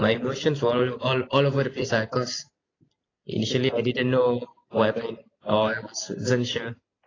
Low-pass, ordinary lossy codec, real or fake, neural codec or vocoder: 7.2 kHz; AAC, 32 kbps; real; none